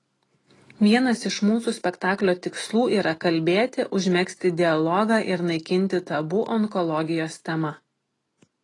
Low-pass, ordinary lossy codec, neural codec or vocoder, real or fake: 10.8 kHz; AAC, 32 kbps; none; real